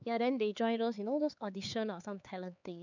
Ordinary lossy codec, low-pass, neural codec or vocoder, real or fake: none; 7.2 kHz; codec, 16 kHz, 4 kbps, X-Codec, HuBERT features, trained on LibriSpeech; fake